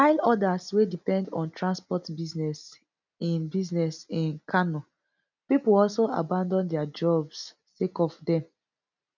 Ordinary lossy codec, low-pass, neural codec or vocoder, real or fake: none; 7.2 kHz; none; real